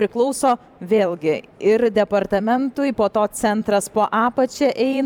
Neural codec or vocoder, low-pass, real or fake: vocoder, 44.1 kHz, 128 mel bands every 512 samples, BigVGAN v2; 19.8 kHz; fake